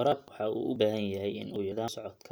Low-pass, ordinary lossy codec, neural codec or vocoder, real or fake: none; none; none; real